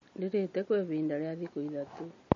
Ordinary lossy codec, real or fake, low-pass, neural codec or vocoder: MP3, 32 kbps; real; 7.2 kHz; none